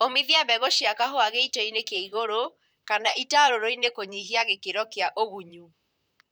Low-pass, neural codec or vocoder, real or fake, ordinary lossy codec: none; vocoder, 44.1 kHz, 128 mel bands, Pupu-Vocoder; fake; none